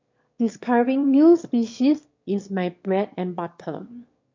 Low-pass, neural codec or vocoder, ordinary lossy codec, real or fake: 7.2 kHz; autoencoder, 22.05 kHz, a latent of 192 numbers a frame, VITS, trained on one speaker; MP3, 48 kbps; fake